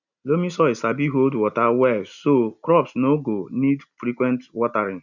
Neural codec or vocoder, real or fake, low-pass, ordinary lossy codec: none; real; 7.2 kHz; none